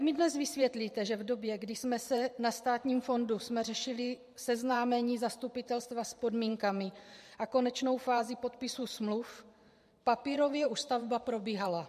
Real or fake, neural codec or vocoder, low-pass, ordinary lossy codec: fake; vocoder, 44.1 kHz, 128 mel bands every 512 samples, BigVGAN v2; 14.4 kHz; MP3, 64 kbps